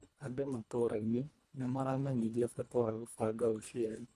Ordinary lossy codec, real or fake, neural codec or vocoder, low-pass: none; fake; codec, 24 kHz, 1.5 kbps, HILCodec; none